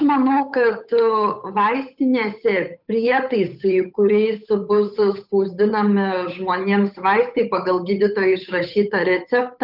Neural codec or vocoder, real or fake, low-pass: codec, 16 kHz, 8 kbps, FunCodec, trained on Chinese and English, 25 frames a second; fake; 5.4 kHz